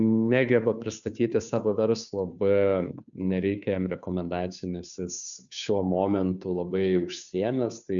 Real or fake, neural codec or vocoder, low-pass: fake; codec, 16 kHz, 2 kbps, FunCodec, trained on Chinese and English, 25 frames a second; 7.2 kHz